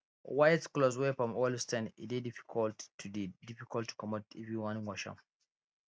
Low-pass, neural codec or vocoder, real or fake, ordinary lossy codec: none; none; real; none